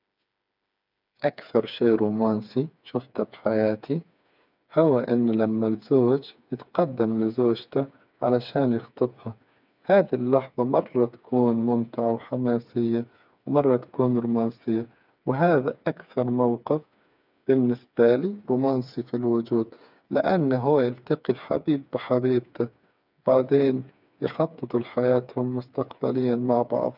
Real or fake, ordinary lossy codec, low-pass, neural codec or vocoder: fake; none; 5.4 kHz; codec, 16 kHz, 4 kbps, FreqCodec, smaller model